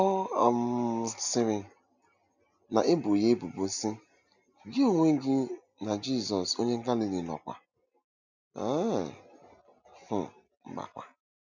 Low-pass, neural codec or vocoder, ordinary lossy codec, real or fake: 7.2 kHz; none; none; real